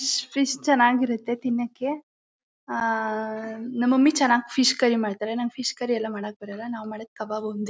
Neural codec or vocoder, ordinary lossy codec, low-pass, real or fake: none; none; none; real